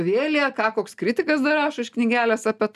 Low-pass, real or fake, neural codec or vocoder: 14.4 kHz; real; none